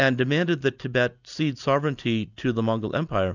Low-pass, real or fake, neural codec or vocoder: 7.2 kHz; real; none